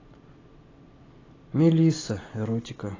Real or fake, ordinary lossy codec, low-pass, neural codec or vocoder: real; AAC, 32 kbps; 7.2 kHz; none